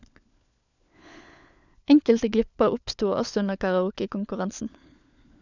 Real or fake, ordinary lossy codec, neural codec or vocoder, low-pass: fake; none; codec, 16 kHz, 4 kbps, FunCodec, trained on LibriTTS, 50 frames a second; 7.2 kHz